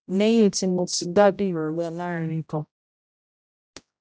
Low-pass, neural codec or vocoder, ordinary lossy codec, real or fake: none; codec, 16 kHz, 0.5 kbps, X-Codec, HuBERT features, trained on general audio; none; fake